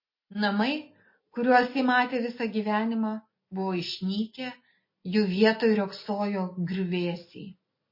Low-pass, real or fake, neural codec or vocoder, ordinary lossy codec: 5.4 kHz; real; none; MP3, 24 kbps